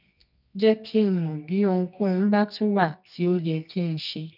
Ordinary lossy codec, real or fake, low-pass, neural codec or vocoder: none; fake; 5.4 kHz; codec, 24 kHz, 0.9 kbps, WavTokenizer, medium music audio release